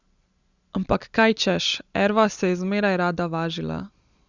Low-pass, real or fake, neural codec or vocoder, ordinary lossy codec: 7.2 kHz; real; none; Opus, 64 kbps